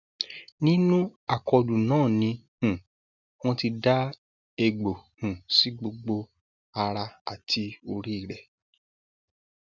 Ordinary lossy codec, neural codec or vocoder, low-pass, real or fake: none; none; 7.2 kHz; real